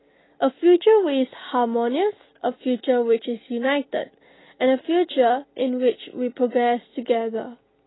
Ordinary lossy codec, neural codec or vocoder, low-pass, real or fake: AAC, 16 kbps; none; 7.2 kHz; real